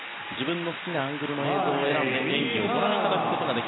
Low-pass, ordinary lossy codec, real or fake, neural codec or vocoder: 7.2 kHz; AAC, 16 kbps; real; none